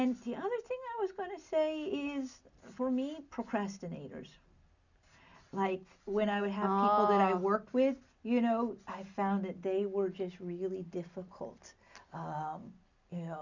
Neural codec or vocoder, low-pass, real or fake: none; 7.2 kHz; real